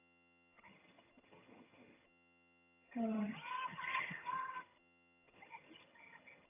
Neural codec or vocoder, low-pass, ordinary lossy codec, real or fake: vocoder, 22.05 kHz, 80 mel bands, HiFi-GAN; 3.6 kHz; AAC, 24 kbps; fake